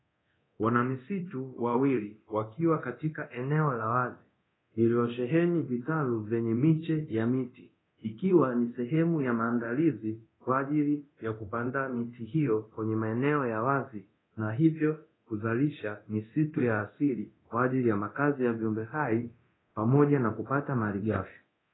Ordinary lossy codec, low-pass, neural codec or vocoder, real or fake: AAC, 16 kbps; 7.2 kHz; codec, 24 kHz, 0.9 kbps, DualCodec; fake